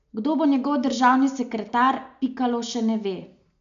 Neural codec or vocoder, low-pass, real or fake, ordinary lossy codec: none; 7.2 kHz; real; AAC, 64 kbps